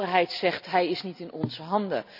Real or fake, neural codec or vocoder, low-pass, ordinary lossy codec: real; none; 5.4 kHz; none